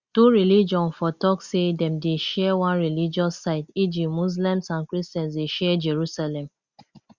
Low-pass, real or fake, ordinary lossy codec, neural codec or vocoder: 7.2 kHz; real; Opus, 64 kbps; none